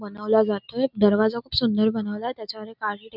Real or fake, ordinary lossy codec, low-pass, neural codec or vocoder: real; none; 5.4 kHz; none